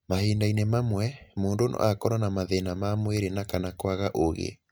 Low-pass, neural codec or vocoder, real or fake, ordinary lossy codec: none; none; real; none